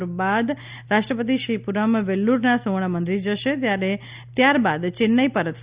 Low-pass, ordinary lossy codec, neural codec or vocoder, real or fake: 3.6 kHz; Opus, 64 kbps; none; real